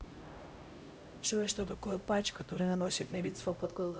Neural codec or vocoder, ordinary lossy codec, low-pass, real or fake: codec, 16 kHz, 0.5 kbps, X-Codec, HuBERT features, trained on LibriSpeech; none; none; fake